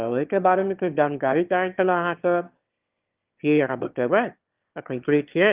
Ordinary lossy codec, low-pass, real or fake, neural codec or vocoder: Opus, 32 kbps; 3.6 kHz; fake; autoencoder, 22.05 kHz, a latent of 192 numbers a frame, VITS, trained on one speaker